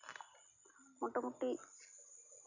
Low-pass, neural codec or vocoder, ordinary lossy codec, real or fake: 7.2 kHz; none; none; real